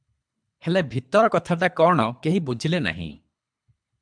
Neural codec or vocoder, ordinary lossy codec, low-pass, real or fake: codec, 24 kHz, 3 kbps, HILCodec; MP3, 96 kbps; 9.9 kHz; fake